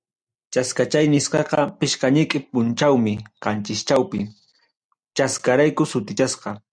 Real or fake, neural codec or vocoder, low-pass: real; none; 9.9 kHz